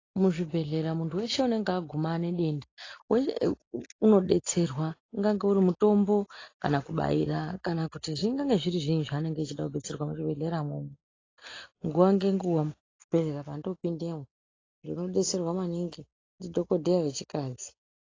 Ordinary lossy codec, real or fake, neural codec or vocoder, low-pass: AAC, 32 kbps; real; none; 7.2 kHz